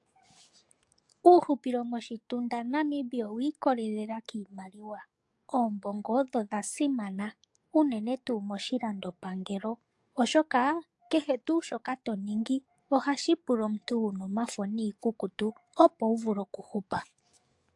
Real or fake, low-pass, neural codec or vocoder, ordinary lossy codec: fake; 10.8 kHz; codec, 44.1 kHz, 7.8 kbps, DAC; AAC, 64 kbps